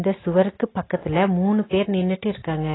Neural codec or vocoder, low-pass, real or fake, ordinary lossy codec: none; 7.2 kHz; real; AAC, 16 kbps